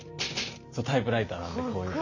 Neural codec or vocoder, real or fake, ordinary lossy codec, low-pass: none; real; none; 7.2 kHz